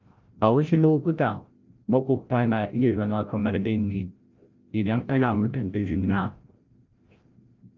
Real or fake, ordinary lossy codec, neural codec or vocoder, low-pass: fake; Opus, 32 kbps; codec, 16 kHz, 0.5 kbps, FreqCodec, larger model; 7.2 kHz